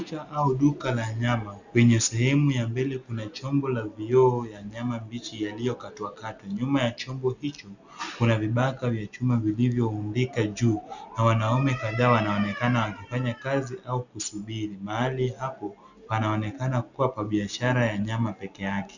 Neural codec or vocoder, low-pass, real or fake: none; 7.2 kHz; real